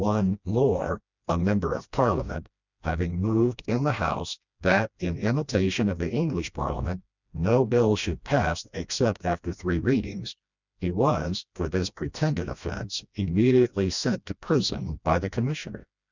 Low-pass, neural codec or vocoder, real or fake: 7.2 kHz; codec, 16 kHz, 1 kbps, FreqCodec, smaller model; fake